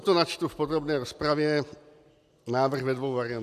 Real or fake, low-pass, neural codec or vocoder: real; 14.4 kHz; none